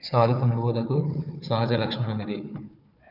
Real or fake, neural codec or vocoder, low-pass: fake; codec, 16 kHz, 4 kbps, FunCodec, trained on Chinese and English, 50 frames a second; 5.4 kHz